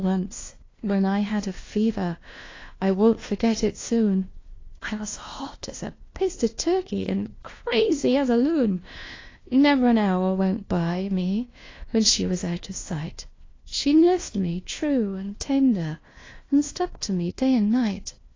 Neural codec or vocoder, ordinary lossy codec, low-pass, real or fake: codec, 16 kHz, 1 kbps, FunCodec, trained on LibriTTS, 50 frames a second; AAC, 32 kbps; 7.2 kHz; fake